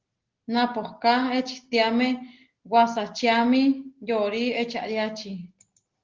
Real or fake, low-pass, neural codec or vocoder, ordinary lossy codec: real; 7.2 kHz; none; Opus, 16 kbps